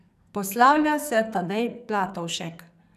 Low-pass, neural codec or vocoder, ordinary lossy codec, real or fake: 14.4 kHz; codec, 44.1 kHz, 2.6 kbps, SNAC; none; fake